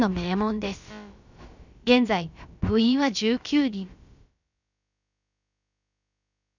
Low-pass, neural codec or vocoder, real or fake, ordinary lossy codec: 7.2 kHz; codec, 16 kHz, about 1 kbps, DyCAST, with the encoder's durations; fake; none